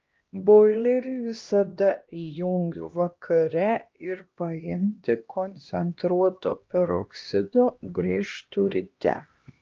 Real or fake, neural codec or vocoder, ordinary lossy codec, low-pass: fake; codec, 16 kHz, 1 kbps, X-Codec, HuBERT features, trained on LibriSpeech; Opus, 24 kbps; 7.2 kHz